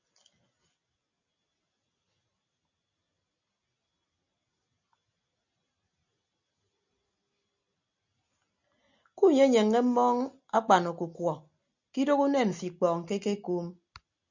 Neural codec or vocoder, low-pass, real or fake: none; 7.2 kHz; real